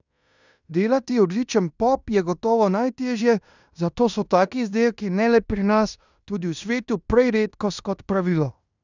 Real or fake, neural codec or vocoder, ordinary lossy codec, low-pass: fake; codec, 16 kHz in and 24 kHz out, 0.9 kbps, LongCat-Audio-Codec, fine tuned four codebook decoder; none; 7.2 kHz